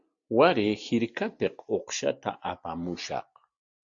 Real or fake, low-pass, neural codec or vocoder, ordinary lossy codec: real; 7.2 kHz; none; Opus, 64 kbps